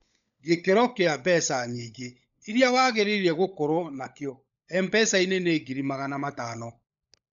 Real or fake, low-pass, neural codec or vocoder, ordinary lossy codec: fake; 7.2 kHz; codec, 16 kHz, 16 kbps, FunCodec, trained on LibriTTS, 50 frames a second; none